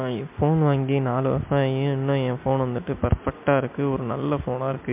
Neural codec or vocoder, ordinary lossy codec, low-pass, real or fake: none; MP3, 32 kbps; 3.6 kHz; real